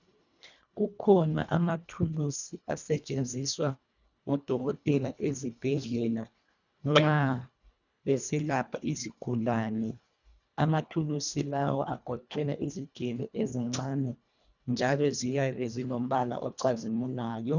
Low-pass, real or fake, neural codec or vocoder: 7.2 kHz; fake; codec, 24 kHz, 1.5 kbps, HILCodec